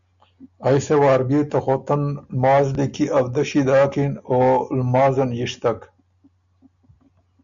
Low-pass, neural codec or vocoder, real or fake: 7.2 kHz; none; real